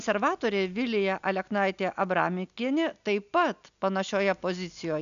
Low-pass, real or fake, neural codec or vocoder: 7.2 kHz; real; none